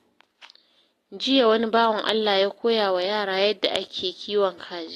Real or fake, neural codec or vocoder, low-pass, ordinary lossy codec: real; none; 14.4 kHz; AAC, 48 kbps